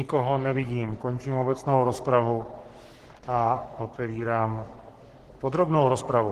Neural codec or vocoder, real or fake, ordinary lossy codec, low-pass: codec, 44.1 kHz, 3.4 kbps, Pupu-Codec; fake; Opus, 16 kbps; 14.4 kHz